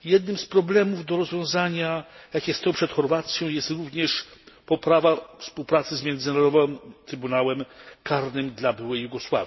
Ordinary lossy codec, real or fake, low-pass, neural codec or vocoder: MP3, 24 kbps; real; 7.2 kHz; none